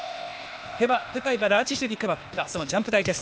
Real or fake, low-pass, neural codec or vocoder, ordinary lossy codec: fake; none; codec, 16 kHz, 0.8 kbps, ZipCodec; none